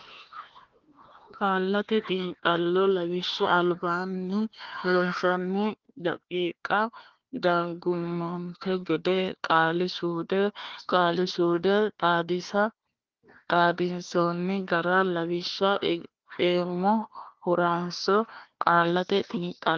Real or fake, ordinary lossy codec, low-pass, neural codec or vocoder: fake; Opus, 32 kbps; 7.2 kHz; codec, 16 kHz, 1 kbps, FunCodec, trained on Chinese and English, 50 frames a second